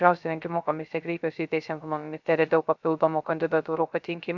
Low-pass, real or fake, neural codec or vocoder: 7.2 kHz; fake; codec, 16 kHz, 0.3 kbps, FocalCodec